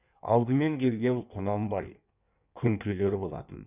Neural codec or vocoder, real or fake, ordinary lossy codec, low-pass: codec, 16 kHz in and 24 kHz out, 1.1 kbps, FireRedTTS-2 codec; fake; none; 3.6 kHz